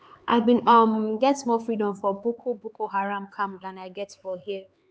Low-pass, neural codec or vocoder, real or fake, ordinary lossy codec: none; codec, 16 kHz, 4 kbps, X-Codec, HuBERT features, trained on LibriSpeech; fake; none